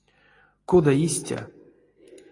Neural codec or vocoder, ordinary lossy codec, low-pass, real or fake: none; AAC, 32 kbps; 10.8 kHz; real